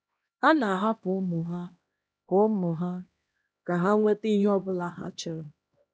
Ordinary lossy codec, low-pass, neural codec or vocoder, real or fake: none; none; codec, 16 kHz, 1 kbps, X-Codec, HuBERT features, trained on LibriSpeech; fake